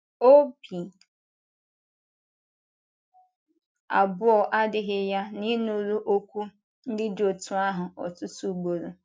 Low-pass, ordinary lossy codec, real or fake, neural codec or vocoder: none; none; real; none